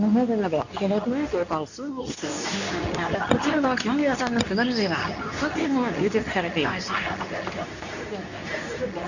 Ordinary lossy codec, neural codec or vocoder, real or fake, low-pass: none; codec, 24 kHz, 0.9 kbps, WavTokenizer, medium speech release version 2; fake; 7.2 kHz